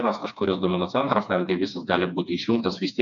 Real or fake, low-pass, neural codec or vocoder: fake; 7.2 kHz; codec, 16 kHz, 2 kbps, FreqCodec, smaller model